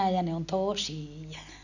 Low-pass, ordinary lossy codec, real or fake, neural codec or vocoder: 7.2 kHz; none; real; none